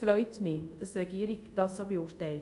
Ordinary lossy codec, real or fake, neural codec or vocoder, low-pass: none; fake; codec, 24 kHz, 0.5 kbps, DualCodec; 10.8 kHz